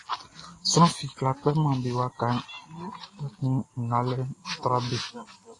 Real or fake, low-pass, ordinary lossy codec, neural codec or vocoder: real; 10.8 kHz; AAC, 32 kbps; none